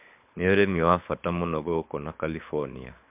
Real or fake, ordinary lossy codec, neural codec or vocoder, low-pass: fake; MP3, 32 kbps; codec, 24 kHz, 6 kbps, HILCodec; 3.6 kHz